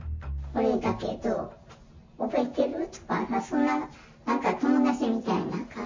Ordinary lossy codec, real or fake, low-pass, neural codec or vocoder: MP3, 48 kbps; real; 7.2 kHz; none